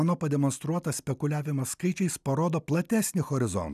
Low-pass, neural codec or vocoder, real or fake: 14.4 kHz; none; real